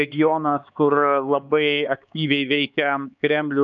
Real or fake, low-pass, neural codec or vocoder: fake; 7.2 kHz; codec, 16 kHz, 4 kbps, X-Codec, HuBERT features, trained on LibriSpeech